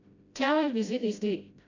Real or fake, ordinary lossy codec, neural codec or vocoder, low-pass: fake; AAC, 48 kbps; codec, 16 kHz, 0.5 kbps, FreqCodec, smaller model; 7.2 kHz